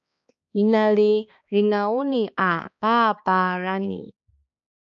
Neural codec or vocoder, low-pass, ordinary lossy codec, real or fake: codec, 16 kHz, 2 kbps, X-Codec, HuBERT features, trained on balanced general audio; 7.2 kHz; MP3, 64 kbps; fake